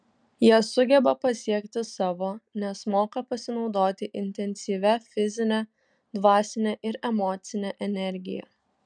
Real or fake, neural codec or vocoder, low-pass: real; none; 9.9 kHz